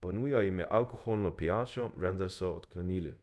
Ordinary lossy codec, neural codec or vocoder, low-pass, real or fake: none; codec, 24 kHz, 0.5 kbps, DualCodec; none; fake